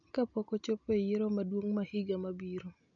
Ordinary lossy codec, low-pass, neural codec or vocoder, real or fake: none; 7.2 kHz; none; real